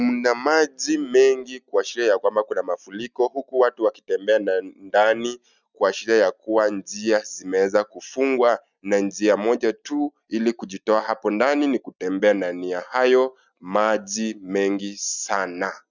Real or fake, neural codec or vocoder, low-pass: real; none; 7.2 kHz